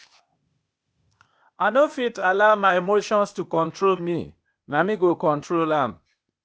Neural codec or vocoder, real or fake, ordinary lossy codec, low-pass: codec, 16 kHz, 0.8 kbps, ZipCodec; fake; none; none